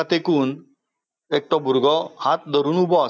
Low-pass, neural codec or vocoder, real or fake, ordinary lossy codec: none; none; real; none